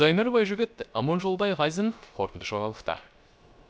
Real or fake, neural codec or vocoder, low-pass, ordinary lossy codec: fake; codec, 16 kHz, 0.3 kbps, FocalCodec; none; none